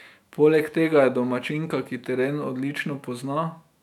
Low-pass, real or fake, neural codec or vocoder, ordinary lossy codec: 19.8 kHz; fake; autoencoder, 48 kHz, 128 numbers a frame, DAC-VAE, trained on Japanese speech; none